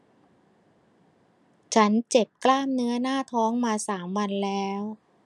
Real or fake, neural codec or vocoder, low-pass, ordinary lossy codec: real; none; none; none